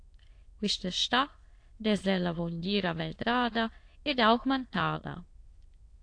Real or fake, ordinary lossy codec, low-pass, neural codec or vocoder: fake; AAC, 48 kbps; 9.9 kHz; autoencoder, 22.05 kHz, a latent of 192 numbers a frame, VITS, trained on many speakers